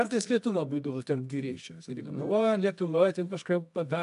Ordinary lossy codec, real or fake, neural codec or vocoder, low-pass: AAC, 96 kbps; fake; codec, 24 kHz, 0.9 kbps, WavTokenizer, medium music audio release; 10.8 kHz